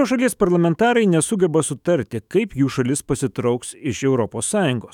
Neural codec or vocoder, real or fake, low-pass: autoencoder, 48 kHz, 128 numbers a frame, DAC-VAE, trained on Japanese speech; fake; 19.8 kHz